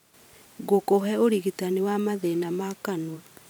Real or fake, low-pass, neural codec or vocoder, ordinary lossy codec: fake; none; vocoder, 44.1 kHz, 128 mel bands every 512 samples, BigVGAN v2; none